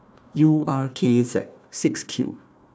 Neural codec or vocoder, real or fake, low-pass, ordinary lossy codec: codec, 16 kHz, 1 kbps, FunCodec, trained on Chinese and English, 50 frames a second; fake; none; none